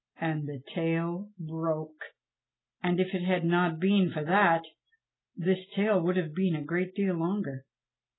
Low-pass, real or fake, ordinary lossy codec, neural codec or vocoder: 7.2 kHz; real; AAC, 16 kbps; none